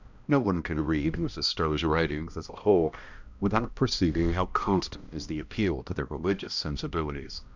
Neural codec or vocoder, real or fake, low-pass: codec, 16 kHz, 1 kbps, X-Codec, HuBERT features, trained on balanced general audio; fake; 7.2 kHz